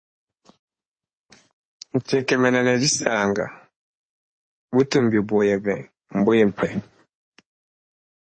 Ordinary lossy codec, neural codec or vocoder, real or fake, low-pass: MP3, 32 kbps; codec, 16 kHz in and 24 kHz out, 2.2 kbps, FireRedTTS-2 codec; fake; 9.9 kHz